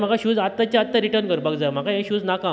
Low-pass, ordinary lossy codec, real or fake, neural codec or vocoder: none; none; real; none